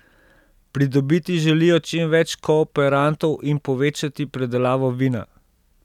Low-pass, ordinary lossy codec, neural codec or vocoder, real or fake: 19.8 kHz; none; none; real